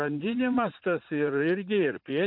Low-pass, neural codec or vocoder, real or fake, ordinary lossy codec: 5.4 kHz; vocoder, 44.1 kHz, 80 mel bands, Vocos; fake; MP3, 48 kbps